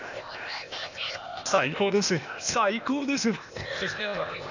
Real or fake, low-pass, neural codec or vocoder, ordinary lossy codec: fake; 7.2 kHz; codec, 16 kHz, 0.8 kbps, ZipCodec; none